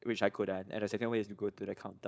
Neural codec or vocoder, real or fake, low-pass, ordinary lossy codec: codec, 16 kHz, 4.8 kbps, FACodec; fake; none; none